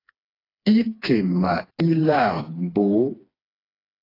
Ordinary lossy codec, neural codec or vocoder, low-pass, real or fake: AAC, 24 kbps; codec, 16 kHz, 2 kbps, FreqCodec, smaller model; 5.4 kHz; fake